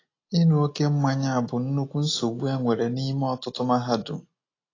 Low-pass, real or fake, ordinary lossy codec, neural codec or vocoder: 7.2 kHz; real; AAC, 32 kbps; none